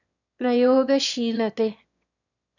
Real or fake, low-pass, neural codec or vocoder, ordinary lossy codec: fake; 7.2 kHz; autoencoder, 22.05 kHz, a latent of 192 numbers a frame, VITS, trained on one speaker; none